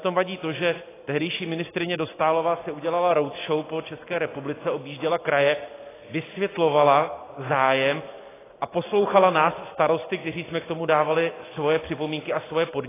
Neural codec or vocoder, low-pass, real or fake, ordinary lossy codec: none; 3.6 kHz; real; AAC, 16 kbps